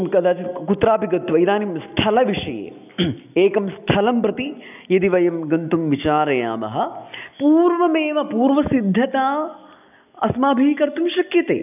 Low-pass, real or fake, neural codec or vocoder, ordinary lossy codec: 3.6 kHz; real; none; none